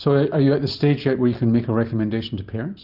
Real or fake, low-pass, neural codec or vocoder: real; 5.4 kHz; none